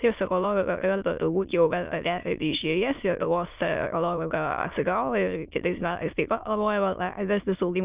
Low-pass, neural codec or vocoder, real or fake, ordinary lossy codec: 3.6 kHz; autoencoder, 22.05 kHz, a latent of 192 numbers a frame, VITS, trained on many speakers; fake; Opus, 24 kbps